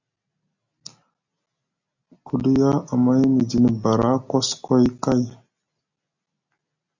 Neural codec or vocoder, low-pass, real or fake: none; 7.2 kHz; real